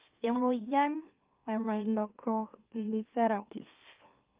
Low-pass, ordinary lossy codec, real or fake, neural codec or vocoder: 3.6 kHz; Opus, 24 kbps; fake; autoencoder, 44.1 kHz, a latent of 192 numbers a frame, MeloTTS